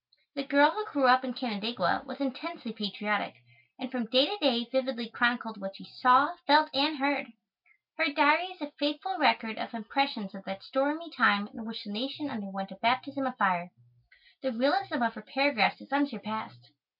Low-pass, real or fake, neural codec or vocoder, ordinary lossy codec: 5.4 kHz; real; none; MP3, 48 kbps